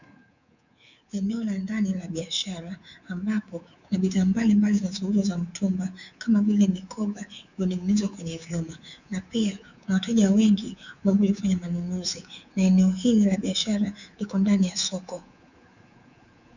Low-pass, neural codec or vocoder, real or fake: 7.2 kHz; codec, 24 kHz, 3.1 kbps, DualCodec; fake